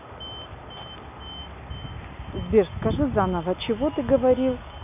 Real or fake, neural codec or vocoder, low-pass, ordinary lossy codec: real; none; 3.6 kHz; AAC, 32 kbps